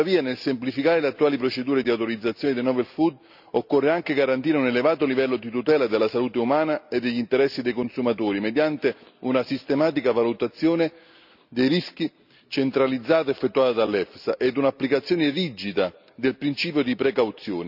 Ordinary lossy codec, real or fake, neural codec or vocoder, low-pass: none; real; none; 5.4 kHz